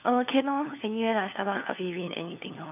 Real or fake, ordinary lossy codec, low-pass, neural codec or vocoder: fake; none; 3.6 kHz; codec, 16 kHz, 4 kbps, FunCodec, trained on LibriTTS, 50 frames a second